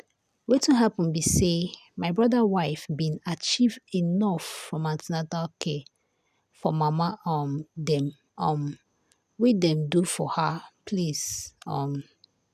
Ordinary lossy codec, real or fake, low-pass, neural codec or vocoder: none; real; 14.4 kHz; none